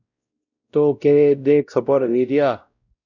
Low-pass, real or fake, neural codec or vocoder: 7.2 kHz; fake; codec, 16 kHz, 0.5 kbps, X-Codec, WavLM features, trained on Multilingual LibriSpeech